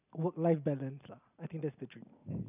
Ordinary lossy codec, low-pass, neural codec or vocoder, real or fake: none; 3.6 kHz; none; real